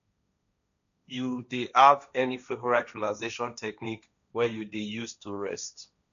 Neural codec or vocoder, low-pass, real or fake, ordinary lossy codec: codec, 16 kHz, 1.1 kbps, Voila-Tokenizer; 7.2 kHz; fake; none